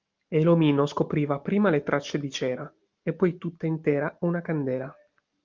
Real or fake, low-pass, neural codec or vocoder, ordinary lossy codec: real; 7.2 kHz; none; Opus, 32 kbps